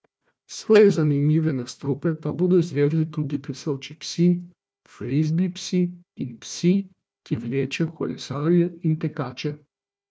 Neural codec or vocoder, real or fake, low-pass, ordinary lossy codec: codec, 16 kHz, 1 kbps, FunCodec, trained on Chinese and English, 50 frames a second; fake; none; none